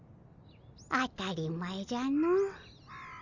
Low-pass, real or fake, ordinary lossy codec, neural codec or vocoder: 7.2 kHz; real; none; none